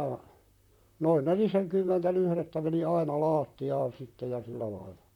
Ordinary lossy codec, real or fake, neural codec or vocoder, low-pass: none; fake; vocoder, 44.1 kHz, 128 mel bands, Pupu-Vocoder; 19.8 kHz